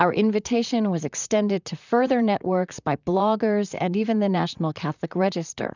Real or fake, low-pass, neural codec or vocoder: fake; 7.2 kHz; vocoder, 44.1 kHz, 128 mel bands, Pupu-Vocoder